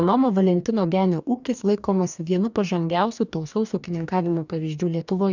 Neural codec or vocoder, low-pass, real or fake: codec, 44.1 kHz, 2.6 kbps, DAC; 7.2 kHz; fake